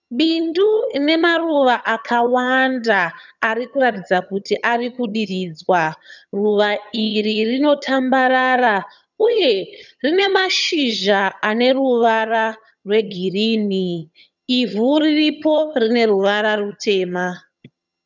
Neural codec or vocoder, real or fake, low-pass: vocoder, 22.05 kHz, 80 mel bands, HiFi-GAN; fake; 7.2 kHz